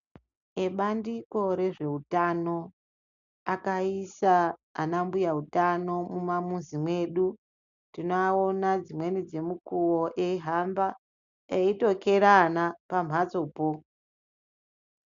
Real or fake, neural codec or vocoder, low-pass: real; none; 7.2 kHz